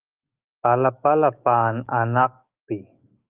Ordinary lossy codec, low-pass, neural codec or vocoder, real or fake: Opus, 24 kbps; 3.6 kHz; autoencoder, 48 kHz, 128 numbers a frame, DAC-VAE, trained on Japanese speech; fake